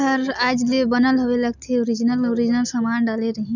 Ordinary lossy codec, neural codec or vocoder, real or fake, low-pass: none; none; real; 7.2 kHz